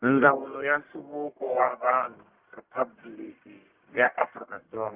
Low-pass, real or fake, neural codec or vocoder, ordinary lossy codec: 3.6 kHz; fake; codec, 44.1 kHz, 1.7 kbps, Pupu-Codec; Opus, 32 kbps